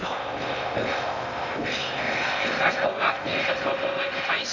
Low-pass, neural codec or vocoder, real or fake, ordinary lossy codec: 7.2 kHz; codec, 16 kHz in and 24 kHz out, 0.6 kbps, FocalCodec, streaming, 2048 codes; fake; none